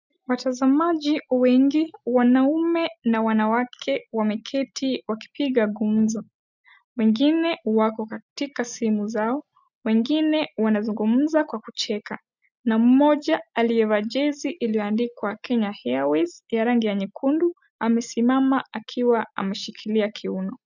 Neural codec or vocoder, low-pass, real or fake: none; 7.2 kHz; real